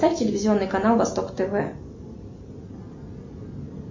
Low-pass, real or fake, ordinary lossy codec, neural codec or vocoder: 7.2 kHz; real; MP3, 32 kbps; none